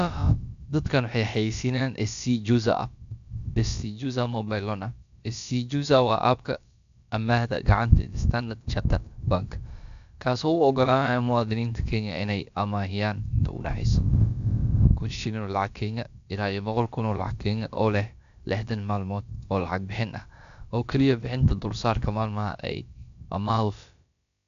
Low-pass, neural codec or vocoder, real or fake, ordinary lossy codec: 7.2 kHz; codec, 16 kHz, about 1 kbps, DyCAST, with the encoder's durations; fake; AAC, 96 kbps